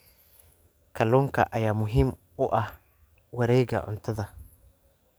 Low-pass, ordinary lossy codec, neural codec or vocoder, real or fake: none; none; codec, 44.1 kHz, 7.8 kbps, DAC; fake